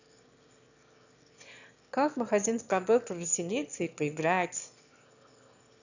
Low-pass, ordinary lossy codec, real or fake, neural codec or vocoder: 7.2 kHz; none; fake; autoencoder, 22.05 kHz, a latent of 192 numbers a frame, VITS, trained on one speaker